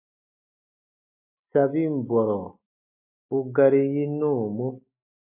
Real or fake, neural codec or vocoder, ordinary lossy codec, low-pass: real; none; AAC, 32 kbps; 3.6 kHz